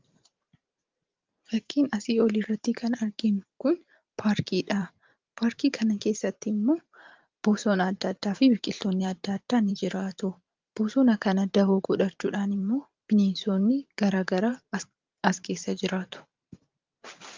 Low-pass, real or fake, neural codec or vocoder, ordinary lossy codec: 7.2 kHz; fake; vocoder, 44.1 kHz, 128 mel bands every 512 samples, BigVGAN v2; Opus, 32 kbps